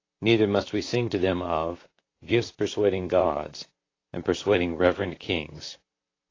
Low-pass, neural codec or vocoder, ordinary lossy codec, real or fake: 7.2 kHz; vocoder, 44.1 kHz, 128 mel bands, Pupu-Vocoder; AAC, 32 kbps; fake